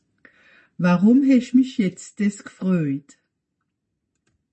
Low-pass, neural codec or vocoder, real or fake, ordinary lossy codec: 10.8 kHz; none; real; MP3, 32 kbps